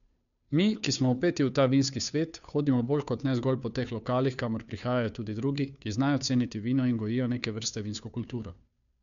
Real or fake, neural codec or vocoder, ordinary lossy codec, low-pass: fake; codec, 16 kHz, 2 kbps, FunCodec, trained on Chinese and English, 25 frames a second; none; 7.2 kHz